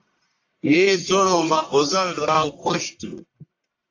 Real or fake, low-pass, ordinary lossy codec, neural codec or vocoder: fake; 7.2 kHz; AAC, 48 kbps; codec, 44.1 kHz, 1.7 kbps, Pupu-Codec